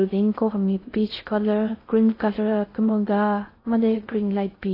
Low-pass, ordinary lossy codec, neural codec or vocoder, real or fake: 5.4 kHz; AAC, 24 kbps; codec, 16 kHz in and 24 kHz out, 0.6 kbps, FocalCodec, streaming, 2048 codes; fake